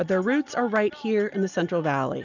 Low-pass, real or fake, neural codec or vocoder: 7.2 kHz; real; none